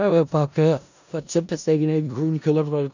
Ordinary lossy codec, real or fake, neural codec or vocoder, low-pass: none; fake; codec, 16 kHz in and 24 kHz out, 0.4 kbps, LongCat-Audio-Codec, four codebook decoder; 7.2 kHz